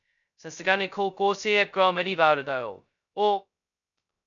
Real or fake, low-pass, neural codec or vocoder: fake; 7.2 kHz; codec, 16 kHz, 0.2 kbps, FocalCodec